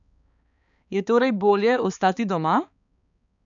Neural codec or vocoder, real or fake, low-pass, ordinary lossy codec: codec, 16 kHz, 4 kbps, X-Codec, HuBERT features, trained on balanced general audio; fake; 7.2 kHz; none